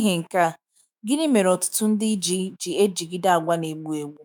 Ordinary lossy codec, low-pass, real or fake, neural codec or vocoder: none; 19.8 kHz; fake; autoencoder, 48 kHz, 128 numbers a frame, DAC-VAE, trained on Japanese speech